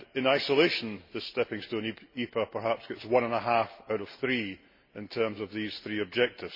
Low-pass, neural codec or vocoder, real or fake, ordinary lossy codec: 5.4 kHz; none; real; MP3, 24 kbps